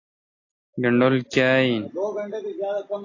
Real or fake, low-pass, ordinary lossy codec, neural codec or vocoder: real; 7.2 kHz; AAC, 32 kbps; none